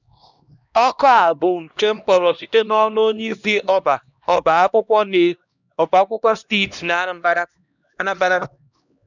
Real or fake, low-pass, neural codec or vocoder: fake; 7.2 kHz; codec, 16 kHz, 1 kbps, X-Codec, HuBERT features, trained on LibriSpeech